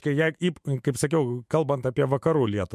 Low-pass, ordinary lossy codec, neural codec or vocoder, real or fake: 14.4 kHz; MP3, 64 kbps; autoencoder, 48 kHz, 128 numbers a frame, DAC-VAE, trained on Japanese speech; fake